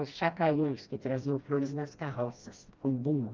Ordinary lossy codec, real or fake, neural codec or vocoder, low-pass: Opus, 32 kbps; fake; codec, 16 kHz, 1 kbps, FreqCodec, smaller model; 7.2 kHz